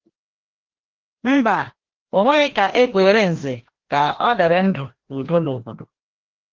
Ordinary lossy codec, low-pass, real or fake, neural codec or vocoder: Opus, 16 kbps; 7.2 kHz; fake; codec, 16 kHz, 1 kbps, FreqCodec, larger model